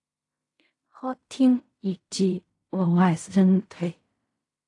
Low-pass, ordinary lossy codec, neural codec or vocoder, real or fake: 10.8 kHz; AAC, 48 kbps; codec, 16 kHz in and 24 kHz out, 0.4 kbps, LongCat-Audio-Codec, fine tuned four codebook decoder; fake